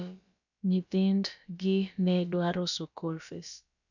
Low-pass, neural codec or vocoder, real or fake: 7.2 kHz; codec, 16 kHz, about 1 kbps, DyCAST, with the encoder's durations; fake